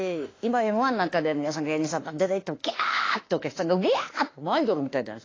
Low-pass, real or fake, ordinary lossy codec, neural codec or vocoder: 7.2 kHz; fake; AAC, 32 kbps; autoencoder, 48 kHz, 32 numbers a frame, DAC-VAE, trained on Japanese speech